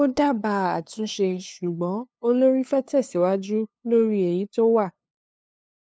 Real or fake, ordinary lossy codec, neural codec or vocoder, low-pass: fake; none; codec, 16 kHz, 2 kbps, FunCodec, trained on LibriTTS, 25 frames a second; none